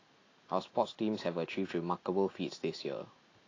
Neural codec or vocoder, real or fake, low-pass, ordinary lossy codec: none; real; 7.2 kHz; AAC, 32 kbps